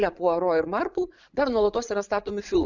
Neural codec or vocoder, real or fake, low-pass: vocoder, 44.1 kHz, 80 mel bands, Vocos; fake; 7.2 kHz